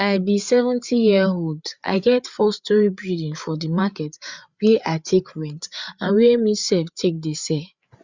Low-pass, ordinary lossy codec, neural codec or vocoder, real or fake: 7.2 kHz; Opus, 64 kbps; codec, 16 kHz, 8 kbps, FreqCodec, larger model; fake